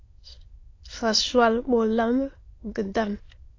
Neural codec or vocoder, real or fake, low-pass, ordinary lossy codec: autoencoder, 22.05 kHz, a latent of 192 numbers a frame, VITS, trained on many speakers; fake; 7.2 kHz; AAC, 32 kbps